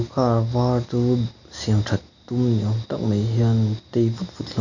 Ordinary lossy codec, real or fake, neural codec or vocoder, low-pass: none; real; none; 7.2 kHz